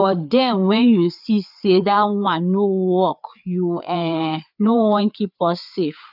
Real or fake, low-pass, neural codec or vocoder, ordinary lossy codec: fake; 5.4 kHz; codec, 16 kHz, 4 kbps, FreqCodec, larger model; none